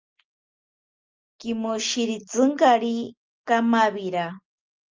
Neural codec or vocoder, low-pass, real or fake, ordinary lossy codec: none; 7.2 kHz; real; Opus, 24 kbps